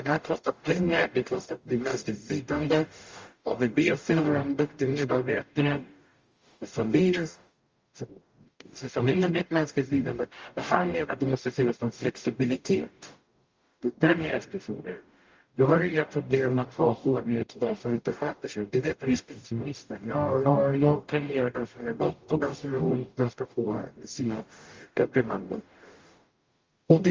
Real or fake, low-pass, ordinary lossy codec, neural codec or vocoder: fake; 7.2 kHz; Opus, 32 kbps; codec, 44.1 kHz, 0.9 kbps, DAC